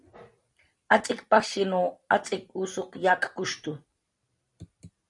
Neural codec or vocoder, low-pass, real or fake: vocoder, 44.1 kHz, 128 mel bands every 256 samples, BigVGAN v2; 10.8 kHz; fake